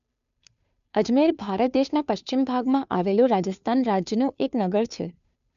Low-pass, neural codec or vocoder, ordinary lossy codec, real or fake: 7.2 kHz; codec, 16 kHz, 2 kbps, FunCodec, trained on Chinese and English, 25 frames a second; none; fake